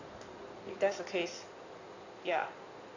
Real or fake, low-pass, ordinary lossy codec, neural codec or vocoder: fake; 7.2 kHz; Opus, 64 kbps; codec, 16 kHz in and 24 kHz out, 2.2 kbps, FireRedTTS-2 codec